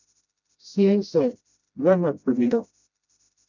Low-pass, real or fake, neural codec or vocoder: 7.2 kHz; fake; codec, 16 kHz, 0.5 kbps, FreqCodec, smaller model